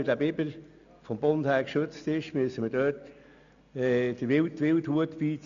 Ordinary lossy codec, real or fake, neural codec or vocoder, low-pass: none; real; none; 7.2 kHz